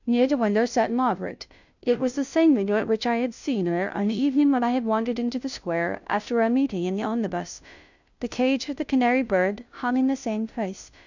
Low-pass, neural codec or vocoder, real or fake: 7.2 kHz; codec, 16 kHz, 0.5 kbps, FunCodec, trained on Chinese and English, 25 frames a second; fake